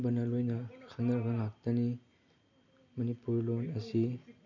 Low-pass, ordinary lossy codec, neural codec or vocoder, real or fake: 7.2 kHz; none; none; real